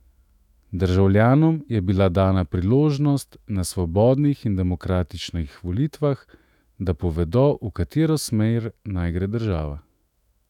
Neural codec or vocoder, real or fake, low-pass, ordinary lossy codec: autoencoder, 48 kHz, 128 numbers a frame, DAC-VAE, trained on Japanese speech; fake; 19.8 kHz; none